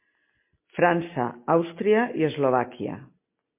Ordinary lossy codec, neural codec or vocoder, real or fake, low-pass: MP3, 24 kbps; none; real; 3.6 kHz